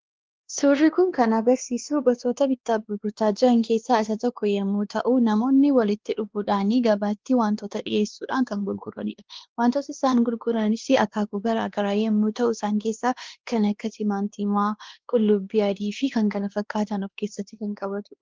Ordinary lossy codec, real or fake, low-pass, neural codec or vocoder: Opus, 16 kbps; fake; 7.2 kHz; codec, 16 kHz, 2 kbps, X-Codec, WavLM features, trained on Multilingual LibriSpeech